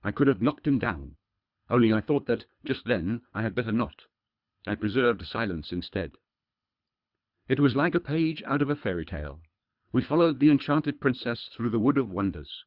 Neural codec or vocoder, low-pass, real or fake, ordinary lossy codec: codec, 24 kHz, 3 kbps, HILCodec; 5.4 kHz; fake; Opus, 64 kbps